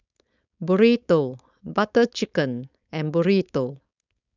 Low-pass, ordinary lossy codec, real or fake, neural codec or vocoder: 7.2 kHz; none; fake; codec, 16 kHz, 4.8 kbps, FACodec